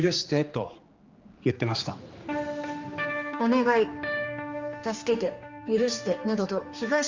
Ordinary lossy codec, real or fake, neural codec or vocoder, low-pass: Opus, 32 kbps; fake; codec, 16 kHz, 2 kbps, X-Codec, HuBERT features, trained on general audio; 7.2 kHz